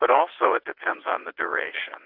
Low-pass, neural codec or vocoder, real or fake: 5.4 kHz; vocoder, 22.05 kHz, 80 mel bands, WaveNeXt; fake